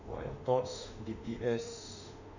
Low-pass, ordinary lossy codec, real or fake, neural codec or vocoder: 7.2 kHz; none; fake; autoencoder, 48 kHz, 32 numbers a frame, DAC-VAE, trained on Japanese speech